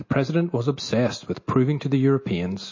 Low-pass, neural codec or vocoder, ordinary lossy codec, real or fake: 7.2 kHz; none; MP3, 32 kbps; real